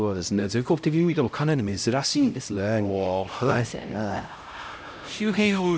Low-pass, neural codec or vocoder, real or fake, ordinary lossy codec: none; codec, 16 kHz, 0.5 kbps, X-Codec, HuBERT features, trained on LibriSpeech; fake; none